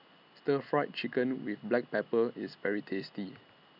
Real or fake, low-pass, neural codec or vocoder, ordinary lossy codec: real; 5.4 kHz; none; none